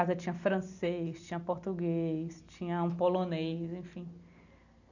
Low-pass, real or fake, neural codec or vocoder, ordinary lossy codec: 7.2 kHz; real; none; none